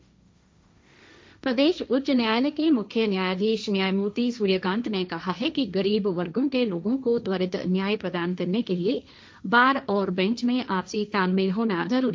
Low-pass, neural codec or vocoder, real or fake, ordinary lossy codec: none; codec, 16 kHz, 1.1 kbps, Voila-Tokenizer; fake; none